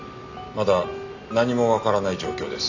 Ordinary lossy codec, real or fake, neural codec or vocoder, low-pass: none; real; none; 7.2 kHz